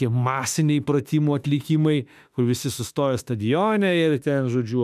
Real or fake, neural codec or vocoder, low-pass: fake; autoencoder, 48 kHz, 32 numbers a frame, DAC-VAE, trained on Japanese speech; 14.4 kHz